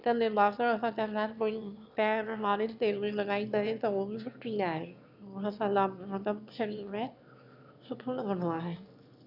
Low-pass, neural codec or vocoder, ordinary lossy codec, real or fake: 5.4 kHz; autoencoder, 22.05 kHz, a latent of 192 numbers a frame, VITS, trained on one speaker; none; fake